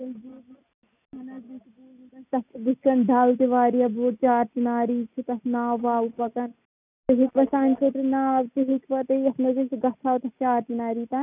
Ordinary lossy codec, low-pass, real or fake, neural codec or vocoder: none; 3.6 kHz; real; none